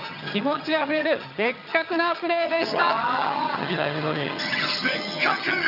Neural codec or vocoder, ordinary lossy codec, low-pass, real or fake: vocoder, 22.05 kHz, 80 mel bands, HiFi-GAN; none; 5.4 kHz; fake